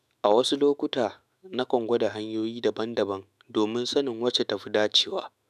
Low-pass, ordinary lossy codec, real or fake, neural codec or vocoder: 14.4 kHz; none; fake; autoencoder, 48 kHz, 128 numbers a frame, DAC-VAE, trained on Japanese speech